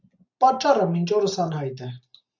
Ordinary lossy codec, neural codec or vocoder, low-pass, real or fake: AAC, 48 kbps; none; 7.2 kHz; real